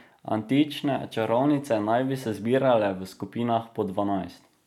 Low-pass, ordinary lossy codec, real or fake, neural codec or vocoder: 19.8 kHz; none; real; none